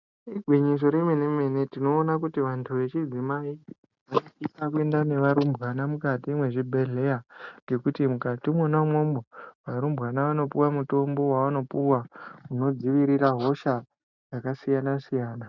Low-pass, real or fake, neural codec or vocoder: 7.2 kHz; real; none